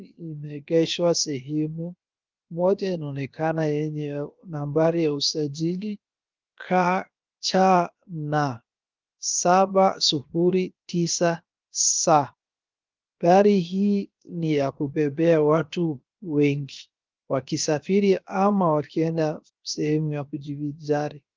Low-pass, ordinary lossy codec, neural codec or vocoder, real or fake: 7.2 kHz; Opus, 32 kbps; codec, 16 kHz, 0.7 kbps, FocalCodec; fake